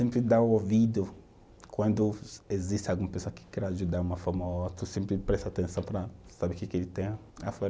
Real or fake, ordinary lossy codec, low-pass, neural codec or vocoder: real; none; none; none